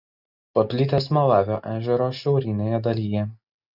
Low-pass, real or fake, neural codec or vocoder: 5.4 kHz; real; none